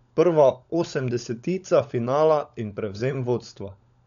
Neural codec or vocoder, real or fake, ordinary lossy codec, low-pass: codec, 16 kHz, 16 kbps, FunCodec, trained on LibriTTS, 50 frames a second; fake; none; 7.2 kHz